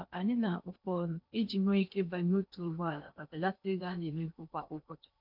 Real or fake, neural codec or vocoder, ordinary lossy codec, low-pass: fake; codec, 16 kHz in and 24 kHz out, 0.8 kbps, FocalCodec, streaming, 65536 codes; none; 5.4 kHz